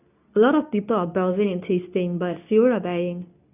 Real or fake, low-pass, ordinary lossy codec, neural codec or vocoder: fake; 3.6 kHz; none; codec, 24 kHz, 0.9 kbps, WavTokenizer, medium speech release version 2